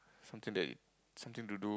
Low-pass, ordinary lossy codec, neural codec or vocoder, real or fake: none; none; none; real